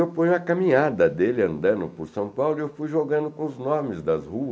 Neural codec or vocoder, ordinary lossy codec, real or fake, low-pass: none; none; real; none